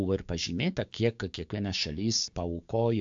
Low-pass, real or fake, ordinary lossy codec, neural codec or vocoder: 7.2 kHz; fake; AAC, 64 kbps; codec, 16 kHz, 6 kbps, DAC